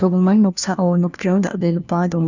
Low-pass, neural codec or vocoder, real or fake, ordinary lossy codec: 7.2 kHz; codec, 16 kHz, 1 kbps, FunCodec, trained on LibriTTS, 50 frames a second; fake; none